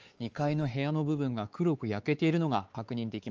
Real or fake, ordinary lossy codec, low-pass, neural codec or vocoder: fake; Opus, 24 kbps; 7.2 kHz; codec, 16 kHz, 4 kbps, X-Codec, WavLM features, trained on Multilingual LibriSpeech